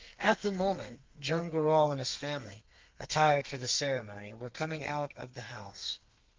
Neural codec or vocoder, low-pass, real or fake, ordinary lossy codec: codec, 32 kHz, 1.9 kbps, SNAC; 7.2 kHz; fake; Opus, 32 kbps